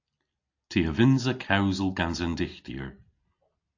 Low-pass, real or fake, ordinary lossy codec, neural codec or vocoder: 7.2 kHz; real; AAC, 48 kbps; none